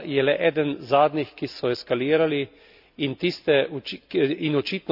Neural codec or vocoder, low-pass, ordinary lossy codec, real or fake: none; 5.4 kHz; AAC, 48 kbps; real